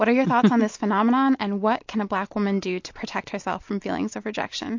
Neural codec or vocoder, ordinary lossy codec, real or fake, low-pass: none; MP3, 48 kbps; real; 7.2 kHz